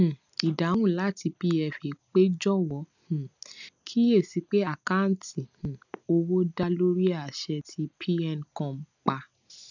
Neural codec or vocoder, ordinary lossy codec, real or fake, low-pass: none; none; real; 7.2 kHz